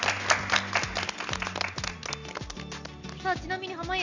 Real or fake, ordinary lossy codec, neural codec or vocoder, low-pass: real; none; none; 7.2 kHz